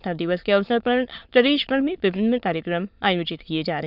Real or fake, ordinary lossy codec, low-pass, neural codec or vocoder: fake; none; 5.4 kHz; autoencoder, 22.05 kHz, a latent of 192 numbers a frame, VITS, trained on many speakers